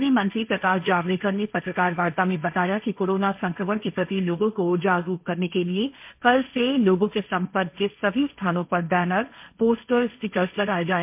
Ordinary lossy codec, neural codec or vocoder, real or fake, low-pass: MP3, 32 kbps; codec, 16 kHz, 1.1 kbps, Voila-Tokenizer; fake; 3.6 kHz